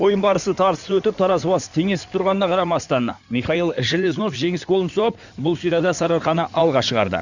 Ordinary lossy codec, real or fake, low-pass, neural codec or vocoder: none; fake; 7.2 kHz; codec, 16 kHz in and 24 kHz out, 2.2 kbps, FireRedTTS-2 codec